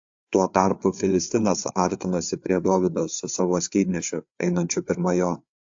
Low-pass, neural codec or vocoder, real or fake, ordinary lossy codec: 7.2 kHz; codec, 16 kHz, 4 kbps, FreqCodec, larger model; fake; AAC, 64 kbps